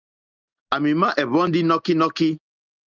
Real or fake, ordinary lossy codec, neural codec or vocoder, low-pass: real; Opus, 24 kbps; none; 7.2 kHz